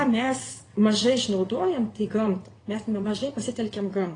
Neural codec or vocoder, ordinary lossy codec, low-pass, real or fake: vocoder, 22.05 kHz, 80 mel bands, WaveNeXt; AAC, 32 kbps; 9.9 kHz; fake